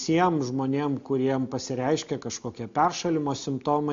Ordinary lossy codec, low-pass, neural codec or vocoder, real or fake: MP3, 48 kbps; 7.2 kHz; none; real